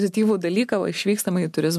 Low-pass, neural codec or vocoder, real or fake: 14.4 kHz; none; real